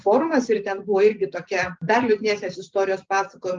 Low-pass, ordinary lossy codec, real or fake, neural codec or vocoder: 7.2 kHz; Opus, 24 kbps; real; none